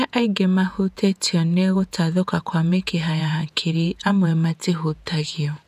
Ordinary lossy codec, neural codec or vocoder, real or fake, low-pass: none; vocoder, 48 kHz, 128 mel bands, Vocos; fake; 14.4 kHz